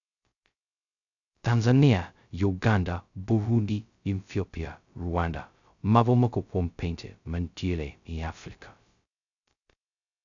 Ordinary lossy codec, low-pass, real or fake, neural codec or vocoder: none; 7.2 kHz; fake; codec, 16 kHz, 0.2 kbps, FocalCodec